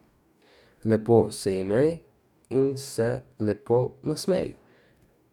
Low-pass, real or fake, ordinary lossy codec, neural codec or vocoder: 19.8 kHz; fake; none; codec, 44.1 kHz, 2.6 kbps, DAC